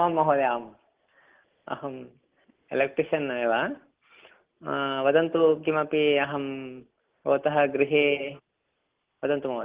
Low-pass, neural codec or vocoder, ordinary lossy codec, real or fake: 3.6 kHz; none; Opus, 16 kbps; real